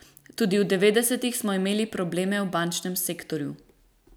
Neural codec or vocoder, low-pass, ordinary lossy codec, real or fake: none; none; none; real